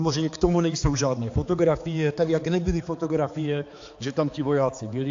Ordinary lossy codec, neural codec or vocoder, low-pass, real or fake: AAC, 48 kbps; codec, 16 kHz, 4 kbps, X-Codec, HuBERT features, trained on balanced general audio; 7.2 kHz; fake